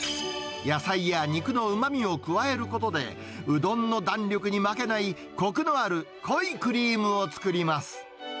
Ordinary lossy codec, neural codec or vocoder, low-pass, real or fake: none; none; none; real